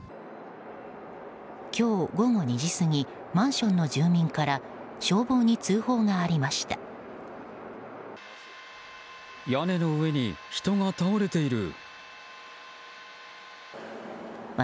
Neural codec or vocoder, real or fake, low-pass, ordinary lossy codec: none; real; none; none